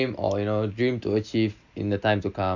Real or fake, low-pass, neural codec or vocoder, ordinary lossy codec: real; 7.2 kHz; none; none